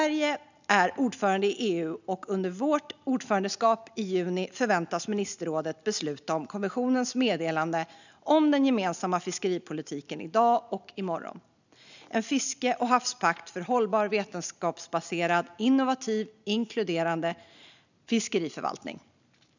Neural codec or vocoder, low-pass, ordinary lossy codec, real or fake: none; 7.2 kHz; none; real